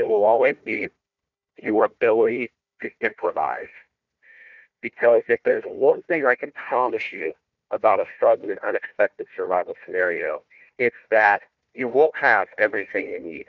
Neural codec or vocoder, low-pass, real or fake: codec, 16 kHz, 1 kbps, FunCodec, trained on Chinese and English, 50 frames a second; 7.2 kHz; fake